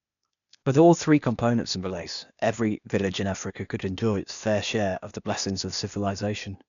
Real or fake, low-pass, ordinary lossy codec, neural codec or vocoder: fake; 7.2 kHz; none; codec, 16 kHz, 0.8 kbps, ZipCodec